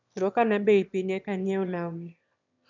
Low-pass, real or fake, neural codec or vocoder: 7.2 kHz; fake; autoencoder, 22.05 kHz, a latent of 192 numbers a frame, VITS, trained on one speaker